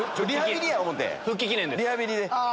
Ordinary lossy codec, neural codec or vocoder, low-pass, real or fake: none; none; none; real